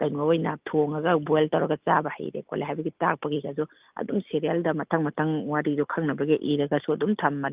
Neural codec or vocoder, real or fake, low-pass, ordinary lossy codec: none; real; 3.6 kHz; Opus, 32 kbps